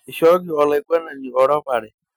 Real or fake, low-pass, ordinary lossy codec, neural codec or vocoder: real; none; none; none